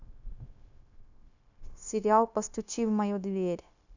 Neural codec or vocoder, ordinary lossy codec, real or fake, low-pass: codec, 16 kHz, 0.9 kbps, LongCat-Audio-Codec; none; fake; 7.2 kHz